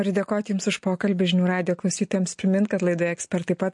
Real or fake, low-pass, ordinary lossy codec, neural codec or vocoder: real; 10.8 kHz; MP3, 48 kbps; none